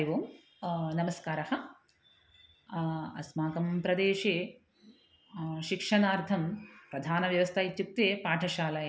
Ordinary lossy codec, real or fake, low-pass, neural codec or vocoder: none; real; none; none